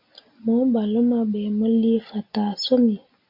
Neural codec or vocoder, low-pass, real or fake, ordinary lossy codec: none; 5.4 kHz; real; MP3, 48 kbps